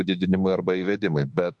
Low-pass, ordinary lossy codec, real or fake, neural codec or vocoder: 10.8 kHz; MP3, 64 kbps; fake; autoencoder, 48 kHz, 32 numbers a frame, DAC-VAE, trained on Japanese speech